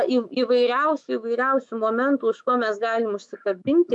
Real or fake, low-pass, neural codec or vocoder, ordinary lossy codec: fake; 10.8 kHz; autoencoder, 48 kHz, 128 numbers a frame, DAC-VAE, trained on Japanese speech; MP3, 64 kbps